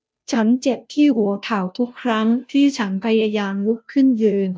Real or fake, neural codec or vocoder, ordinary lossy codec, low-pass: fake; codec, 16 kHz, 0.5 kbps, FunCodec, trained on Chinese and English, 25 frames a second; none; none